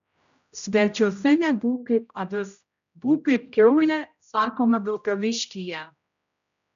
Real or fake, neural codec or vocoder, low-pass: fake; codec, 16 kHz, 0.5 kbps, X-Codec, HuBERT features, trained on general audio; 7.2 kHz